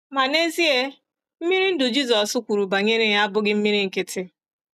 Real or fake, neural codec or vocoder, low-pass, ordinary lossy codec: real; none; 14.4 kHz; none